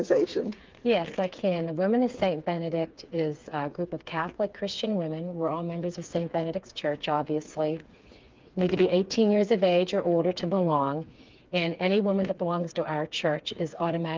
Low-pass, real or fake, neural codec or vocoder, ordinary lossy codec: 7.2 kHz; fake; codec, 16 kHz, 4 kbps, FreqCodec, smaller model; Opus, 32 kbps